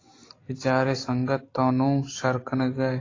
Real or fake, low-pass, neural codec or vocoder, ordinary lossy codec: real; 7.2 kHz; none; AAC, 32 kbps